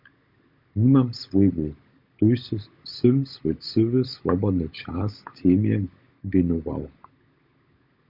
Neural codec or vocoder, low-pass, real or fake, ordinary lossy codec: codec, 16 kHz, 16 kbps, FunCodec, trained on LibriTTS, 50 frames a second; 5.4 kHz; fake; Opus, 64 kbps